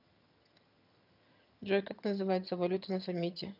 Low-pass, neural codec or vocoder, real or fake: 5.4 kHz; vocoder, 22.05 kHz, 80 mel bands, HiFi-GAN; fake